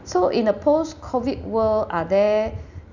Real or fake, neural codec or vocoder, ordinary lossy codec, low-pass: real; none; none; 7.2 kHz